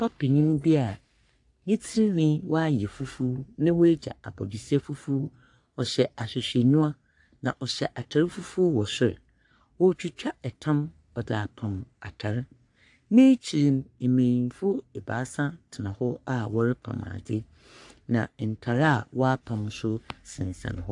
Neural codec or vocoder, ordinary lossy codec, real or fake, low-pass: codec, 44.1 kHz, 3.4 kbps, Pupu-Codec; AAC, 64 kbps; fake; 10.8 kHz